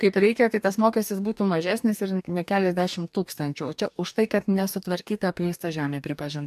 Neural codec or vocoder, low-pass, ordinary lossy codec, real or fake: codec, 44.1 kHz, 2.6 kbps, DAC; 14.4 kHz; AAC, 96 kbps; fake